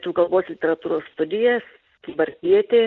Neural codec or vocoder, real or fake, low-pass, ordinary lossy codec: none; real; 7.2 kHz; Opus, 32 kbps